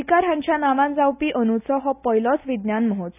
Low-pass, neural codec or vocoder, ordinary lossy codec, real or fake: 3.6 kHz; none; none; real